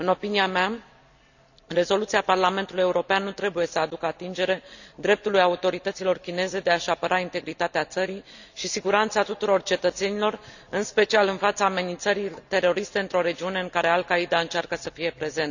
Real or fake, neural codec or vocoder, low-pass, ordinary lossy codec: real; none; 7.2 kHz; none